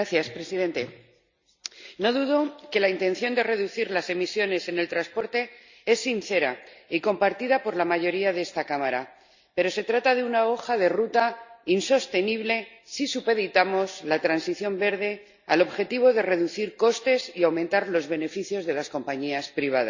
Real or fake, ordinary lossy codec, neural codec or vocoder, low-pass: real; Opus, 64 kbps; none; 7.2 kHz